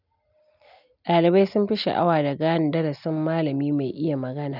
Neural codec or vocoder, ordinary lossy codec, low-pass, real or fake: none; none; 5.4 kHz; real